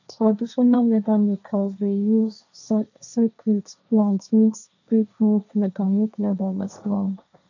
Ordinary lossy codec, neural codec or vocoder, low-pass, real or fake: none; codec, 16 kHz, 1.1 kbps, Voila-Tokenizer; 7.2 kHz; fake